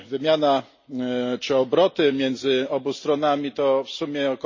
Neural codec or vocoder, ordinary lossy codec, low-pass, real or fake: none; MP3, 32 kbps; 7.2 kHz; real